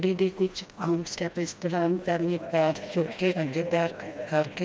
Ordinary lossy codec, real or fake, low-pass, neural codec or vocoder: none; fake; none; codec, 16 kHz, 1 kbps, FreqCodec, smaller model